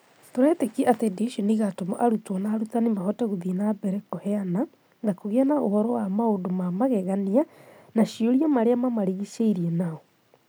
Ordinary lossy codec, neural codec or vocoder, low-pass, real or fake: none; none; none; real